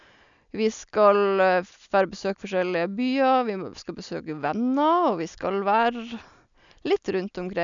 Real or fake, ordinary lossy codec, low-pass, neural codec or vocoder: real; MP3, 96 kbps; 7.2 kHz; none